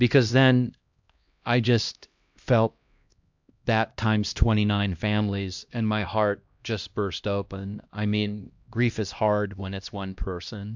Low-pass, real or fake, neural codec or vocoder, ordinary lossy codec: 7.2 kHz; fake; codec, 16 kHz, 1 kbps, X-Codec, HuBERT features, trained on LibriSpeech; MP3, 64 kbps